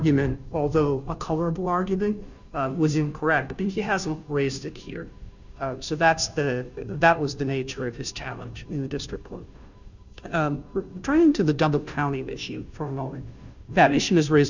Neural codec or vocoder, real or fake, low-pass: codec, 16 kHz, 0.5 kbps, FunCodec, trained on Chinese and English, 25 frames a second; fake; 7.2 kHz